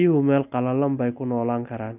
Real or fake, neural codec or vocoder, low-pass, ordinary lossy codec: real; none; 3.6 kHz; none